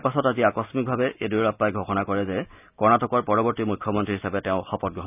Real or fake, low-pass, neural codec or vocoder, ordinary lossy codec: real; 3.6 kHz; none; none